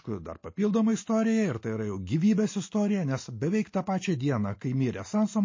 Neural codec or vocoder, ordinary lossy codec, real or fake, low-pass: none; MP3, 32 kbps; real; 7.2 kHz